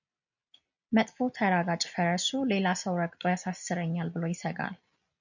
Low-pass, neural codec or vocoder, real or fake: 7.2 kHz; none; real